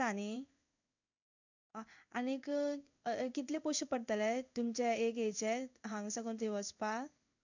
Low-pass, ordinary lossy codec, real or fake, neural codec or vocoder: 7.2 kHz; none; fake; codec, 16 kHz in and 24 kHz out, 1 kbps, XY-Tokenizer